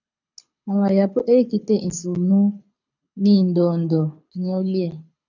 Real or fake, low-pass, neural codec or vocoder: fake; 7.2 kHz; codec, 24 kHz, 6 kbps, HILCodec